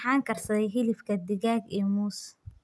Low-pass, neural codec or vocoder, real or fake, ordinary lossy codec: none; none; real; none